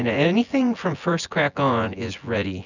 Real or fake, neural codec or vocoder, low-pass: fake; vocoder, 24 kHz, 100 mel bands, Vocos; 7.2 kHz